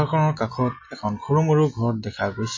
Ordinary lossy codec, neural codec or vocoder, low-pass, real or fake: MP3, 32 kbps; none; 7.2 kHz; real